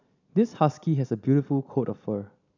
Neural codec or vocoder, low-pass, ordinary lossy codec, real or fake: none; 7.2 kHz; none; real